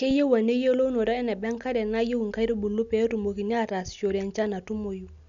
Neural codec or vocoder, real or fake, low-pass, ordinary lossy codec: none; real; 7.2 kHz; none